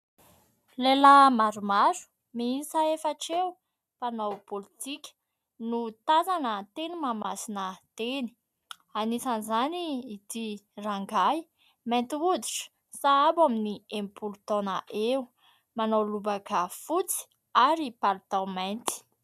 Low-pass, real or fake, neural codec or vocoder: 14.4 kHz; real; none